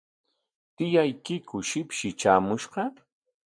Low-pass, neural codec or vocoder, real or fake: 9.9 kHz; none; real